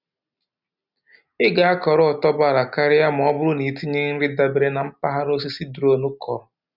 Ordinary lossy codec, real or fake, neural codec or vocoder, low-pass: none; real; none; 5.4 kHz